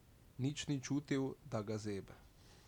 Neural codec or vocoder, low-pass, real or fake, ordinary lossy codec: none; 19.8 kHz; real; none